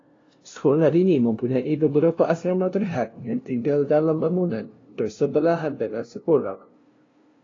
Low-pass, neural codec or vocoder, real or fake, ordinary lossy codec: 7.2 kHz; codec, 16 kHz, 0.5 kbps, FunCodec, trained on LibriTTS, 25 frames a second; fake; AAC, 32 kbps